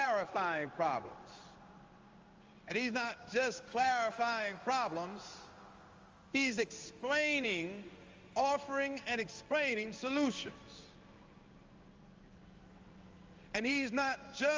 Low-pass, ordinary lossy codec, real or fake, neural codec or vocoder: 7.2 kHz; Opus, 32 kbps; fake; codec, 16 kHz in and 24 kHz out, 1 kbps, XY-Tokenizer